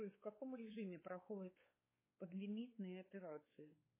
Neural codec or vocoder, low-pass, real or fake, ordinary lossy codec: codec, 16 kHz, 4 kbps, X-Codec, WavLM features, trained on Multilingual LibriSpeech; 3.6 kHz; fake; MP3, 16 kbps